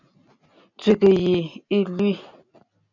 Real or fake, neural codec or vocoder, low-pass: real; none; 7.2 kHz